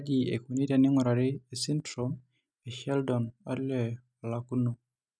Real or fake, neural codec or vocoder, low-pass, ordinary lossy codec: real; none; none; none